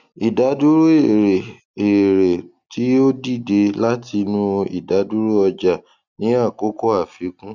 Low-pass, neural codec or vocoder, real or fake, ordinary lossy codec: 7.2 kHz; none; real; none